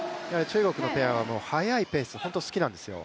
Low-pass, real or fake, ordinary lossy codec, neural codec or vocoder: none; real; none; none